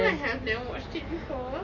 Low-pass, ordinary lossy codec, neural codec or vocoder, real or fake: 7.2 kHz; MP3, 32 kbps; none; real